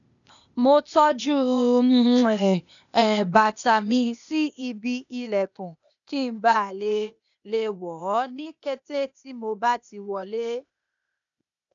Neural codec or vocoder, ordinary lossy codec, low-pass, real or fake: codec, 16 kHz, 0.8 kbps, ZipCodec; none; 7.2 kHz; fake